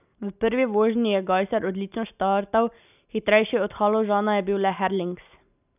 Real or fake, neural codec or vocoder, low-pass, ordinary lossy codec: real; none; 3.6 kHz; none